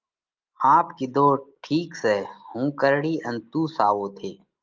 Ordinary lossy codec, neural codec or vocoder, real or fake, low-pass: Opus, 32 kbps; none; real; 7.2 kHz